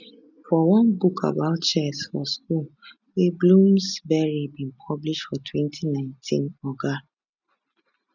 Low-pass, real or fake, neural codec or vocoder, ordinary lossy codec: 7.2 kHz; real; none; none